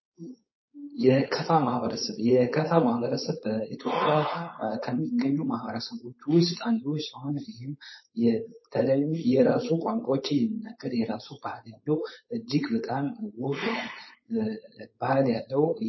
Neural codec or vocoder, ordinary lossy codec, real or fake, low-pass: codec, 16 kHz, 4.8 kbps, FACodec; MP3, 24 kbps; fake; 7.2 kHz